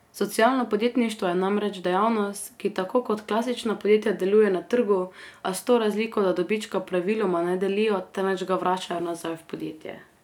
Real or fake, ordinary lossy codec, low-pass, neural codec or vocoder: real; none; 19.8 kHz; none